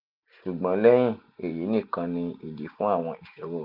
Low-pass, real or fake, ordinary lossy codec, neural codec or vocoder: 5.4 kHz; real; none; none